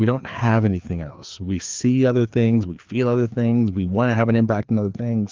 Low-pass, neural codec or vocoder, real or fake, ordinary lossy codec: 7.2 kHz; codec, 16 kHz, 2 kbps, FreqCodec, larger model; fake; Opus, 32 kbps